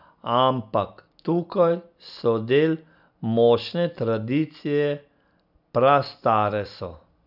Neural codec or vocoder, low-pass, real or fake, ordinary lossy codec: none; 5.4 kHz; real; none